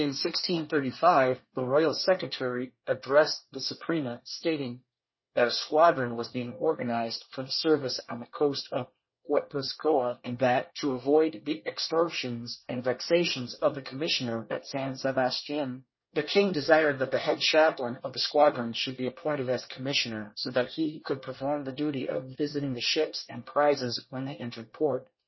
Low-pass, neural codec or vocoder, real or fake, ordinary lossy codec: 7.2 kHz; codec, 24 kHz, 1 kbps, SNAC; fake; MP3, 24 kbps